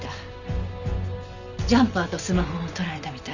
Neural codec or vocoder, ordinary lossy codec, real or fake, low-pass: none; none; real; 7.2 kHz